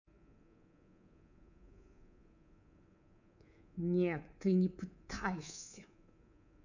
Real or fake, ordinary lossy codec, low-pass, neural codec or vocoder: fake; none; 7.2 kHz; codec, 24 kHz, 3.1 kbps, DualCodec